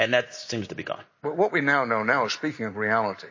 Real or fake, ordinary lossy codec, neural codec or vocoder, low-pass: real; MP3, 32 kbps; none; 7.2 kHz